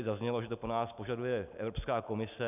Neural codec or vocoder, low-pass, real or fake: none; 3.6 kHz; real